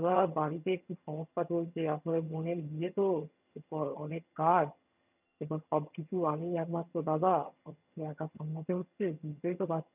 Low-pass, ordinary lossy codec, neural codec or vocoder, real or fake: 3.6 kHz; none; vocoder, 22.05 kHz, 80 mel bands, HiFi-GAN; fake